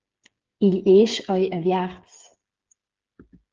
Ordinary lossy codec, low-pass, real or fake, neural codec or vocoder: Opus, 16 kbps; 7.2 kHz; fake; codec, 16 kHz, 8 kbps, FreqCodec, smaller model